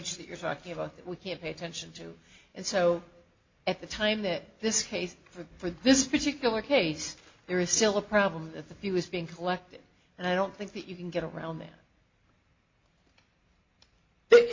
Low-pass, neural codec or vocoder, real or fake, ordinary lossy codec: 7.2 kHz; none; real; MP3, 48 kbps